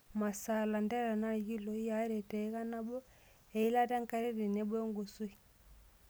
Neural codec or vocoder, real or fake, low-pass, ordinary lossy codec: none; real; none; none